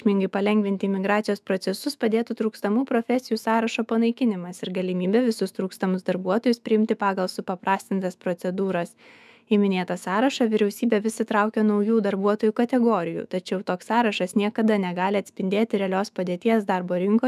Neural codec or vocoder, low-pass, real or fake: autoencoder, 48 kHz, 128 numbers a frame, DAC-VAE, trained on Japanese speech; 14.4 kHz; fake